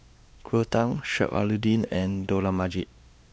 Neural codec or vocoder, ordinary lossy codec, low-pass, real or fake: codec, 16 kHz, 2 kbps, X-Codec, WavLM features, trained on Multilingual LibriSpeech; none; none; fake